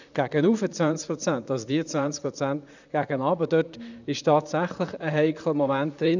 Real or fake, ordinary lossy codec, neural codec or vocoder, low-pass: fake; none; vocoder, 44.1 kHz, 128 mel bands, Pupu-Vocoder; 7.2 kHz